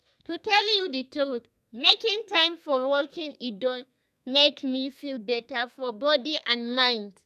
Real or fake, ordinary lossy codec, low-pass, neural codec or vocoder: fake; none; 14.4 kHz; codec, 32 kHz, 1.9 kbps, SNAC